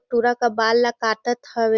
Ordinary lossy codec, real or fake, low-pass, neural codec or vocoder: Opus, 64 kbps; real; 7.2 kHz; none